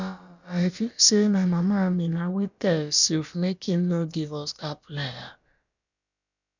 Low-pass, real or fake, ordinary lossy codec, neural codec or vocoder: 7.2 kHz; fake; none; codec, 16 kHz, about 1 kbps, DyCAST, with the encoder's durations